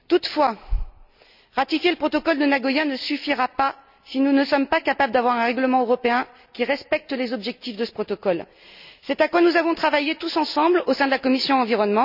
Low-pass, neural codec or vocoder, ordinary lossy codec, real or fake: 5.4 kHz; none; none; real